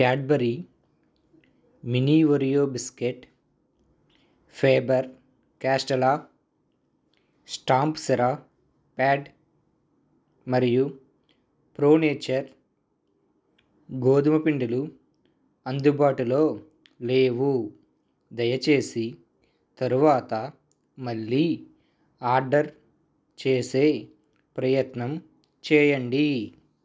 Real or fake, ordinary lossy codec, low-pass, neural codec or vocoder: real; none; none; none